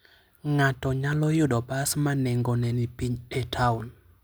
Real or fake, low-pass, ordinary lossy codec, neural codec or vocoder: real; none; none; none